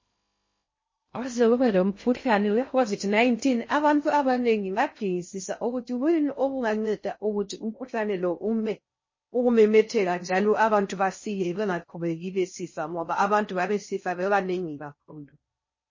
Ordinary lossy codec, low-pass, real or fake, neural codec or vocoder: MP3, 32 kbps; 7.2 kHz; fake; codec, 16 kHz in and 24 kHz out, 0.6 kbps, FocalCodec, streaming, 2048 codes